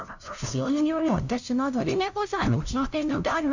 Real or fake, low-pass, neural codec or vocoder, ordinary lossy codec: fake; 7.2 kHz; codec, 16 kHz, 0.5 kbps, FunCodec, trained on LibriTTS, 25 frames a second; none